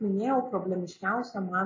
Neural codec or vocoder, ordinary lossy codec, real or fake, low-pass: none; MP3, 32 kbps; real; 7.2 kHz